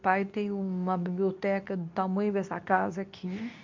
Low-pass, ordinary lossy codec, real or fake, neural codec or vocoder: 7.2 kHz; MP3, 64 kbps; fake; codec, 24 kHz, 0.9 kbps, WavTokenizer, medium speech release version 2